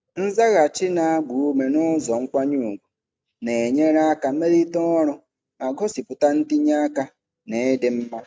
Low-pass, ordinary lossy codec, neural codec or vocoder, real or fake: none; none; none; real